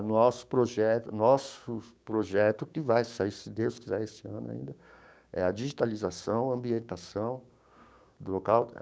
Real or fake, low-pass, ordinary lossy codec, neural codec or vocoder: fake; none; none; codec, 16 kHz, 6 kbps, DAC